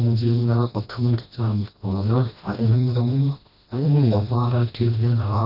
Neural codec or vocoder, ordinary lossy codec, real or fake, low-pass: codec, 16 kHz, 1 kbps, FreqCodec, smaller model; none; fake; 5.4 kHz